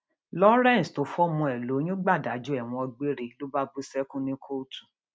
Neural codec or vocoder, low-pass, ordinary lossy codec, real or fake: none; none; none; real